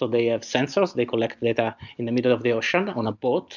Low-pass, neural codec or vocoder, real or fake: 7.2 kHz; none; real